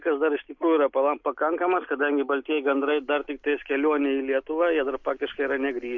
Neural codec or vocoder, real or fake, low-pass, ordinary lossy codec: none; real; 7.2 kHz; MP3, 48 kbps